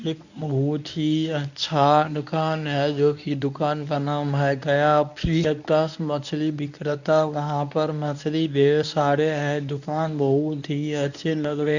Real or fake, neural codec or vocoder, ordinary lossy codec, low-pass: fake; codec, 24 kHz, 0.9 kbps, WavTokenizer, medium speech release version 1; none; 7.2 kHz